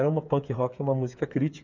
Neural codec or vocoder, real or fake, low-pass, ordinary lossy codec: codec, 16 kHz, 8 kbps, FreqCodec, smaller model; fake; 7.2 kHz; MP3, 64 kbps